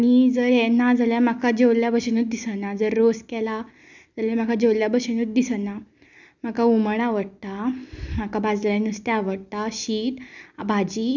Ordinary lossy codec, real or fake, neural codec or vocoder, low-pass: none; real; none; 7.2 kHz